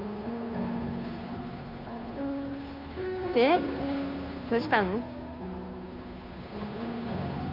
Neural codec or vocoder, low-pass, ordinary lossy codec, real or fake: codec, 16 kHz, 2 kbps, FunCodec, trained on Chinese and English, 25 frames a second; 5.4 kHz; Opus, 64 kbps; fake